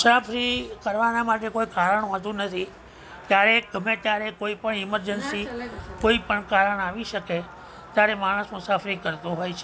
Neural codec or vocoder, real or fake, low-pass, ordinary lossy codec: none; real; none; none